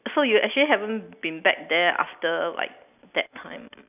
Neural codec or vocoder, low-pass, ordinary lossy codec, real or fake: none; 3.6 kHz; none; real